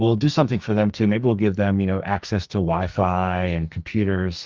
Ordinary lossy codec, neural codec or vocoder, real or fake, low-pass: Opus, 32 kbps; codec, 44.1 kHz, 2.6 kbps, SNAC; fake; 7.2 kHz